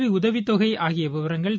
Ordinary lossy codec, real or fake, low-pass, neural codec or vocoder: none; real; none; none